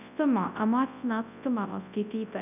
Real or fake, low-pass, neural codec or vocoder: fake; 3.6 kHz; codec, 24 kHz, 0.9 kbps, WavTokenizer, large speech release